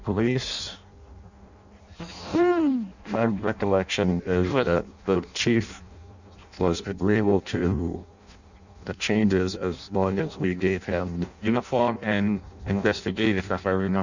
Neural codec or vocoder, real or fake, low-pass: codec, 16 kHz in and 24 kHz out, 0.6 kbps, FireRedTTS-2 codec; fake; 7.2 kHz